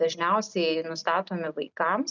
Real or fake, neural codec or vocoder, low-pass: real; none; 7.2 kHz